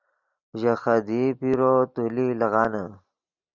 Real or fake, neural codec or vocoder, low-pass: real; none; 7.2 kHz